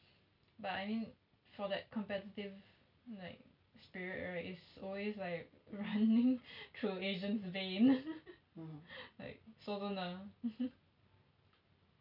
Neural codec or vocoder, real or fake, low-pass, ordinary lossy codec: none; real; 5.4 kHz; none